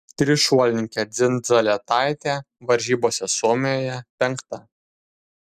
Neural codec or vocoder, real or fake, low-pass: none; real; 14.4 kHz